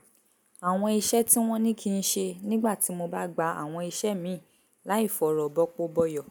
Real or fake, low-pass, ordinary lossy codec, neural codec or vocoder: real; none; none; none